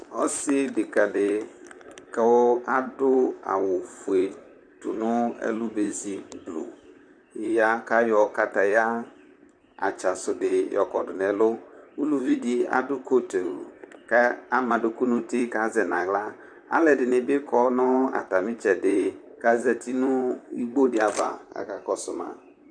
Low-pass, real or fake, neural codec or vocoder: 9.9 kHz; fake; vocoder, 44.1 kHz, 128 mel bands, Pupu-Vocoder